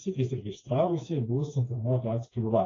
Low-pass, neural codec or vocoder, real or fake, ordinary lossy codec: 7.2 kHz; codec, 16 kHz, 2 kbps, FreqCodec, smaller model; fake; AAC, 32 kbps